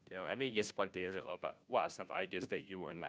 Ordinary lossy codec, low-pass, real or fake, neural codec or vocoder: none; none; fake; codec, 16 kHz, 0.5 kbps, FunCodec, trained on Chinese and English, 25 frames a second